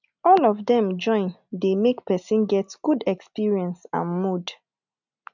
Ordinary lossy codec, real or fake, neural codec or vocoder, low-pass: none; real; none; 7.2 kHz